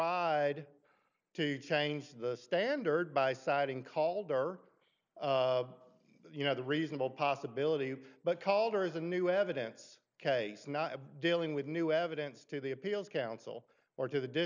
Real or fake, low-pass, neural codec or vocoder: real; 7.2 kHz; none